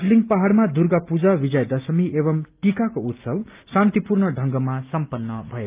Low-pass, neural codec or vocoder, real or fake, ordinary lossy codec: 3.6 kHz; none; real; Opus, 32 kbps